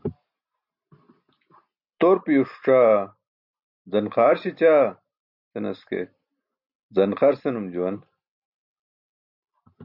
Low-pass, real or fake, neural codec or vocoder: 5.4 kHz; real; none